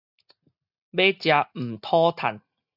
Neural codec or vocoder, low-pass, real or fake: none; 5.4 kHz; real